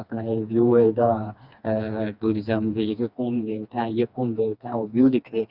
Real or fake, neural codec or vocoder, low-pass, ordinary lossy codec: fake; codec, 16 kHz, 2 kbps, FreqCodec, smaller model; 5.4 kHz; Opus, 64 kbps